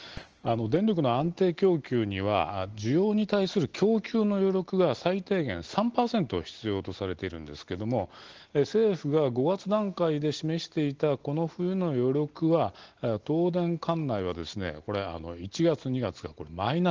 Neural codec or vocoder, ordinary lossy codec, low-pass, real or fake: none; Opus, 16 kbps; 7.2 kHz; real